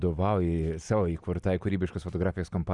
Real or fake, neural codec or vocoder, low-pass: real; none; 10.8 kHz